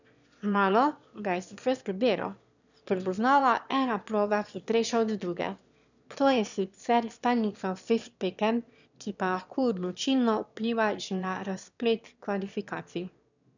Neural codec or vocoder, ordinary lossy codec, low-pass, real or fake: autoencoder, 22.05 kHz, a latent of 192 numbers a frame, VITS, trained on one speaker; none; 7.2 kHz; fake